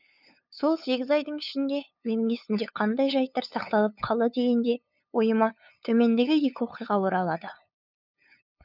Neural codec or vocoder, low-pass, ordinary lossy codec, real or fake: codec, 16 kHz, 8 kbps, FunCodec, trained on LibriTTS, 25 frames a second; 5.4 kHz; none; fake